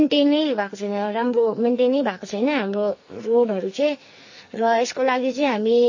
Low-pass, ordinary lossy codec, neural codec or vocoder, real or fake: 7.2 kHz; MP3, 32 kbps; codec, 44.1 kHz, 2.6 kbps, SNAC; fake